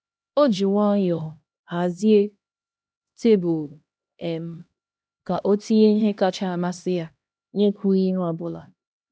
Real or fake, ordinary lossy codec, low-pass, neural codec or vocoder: fake; none; none; codec, 16 kHz, 1 kbps, X-Codec, HuBERT features, trained on LibriSpeech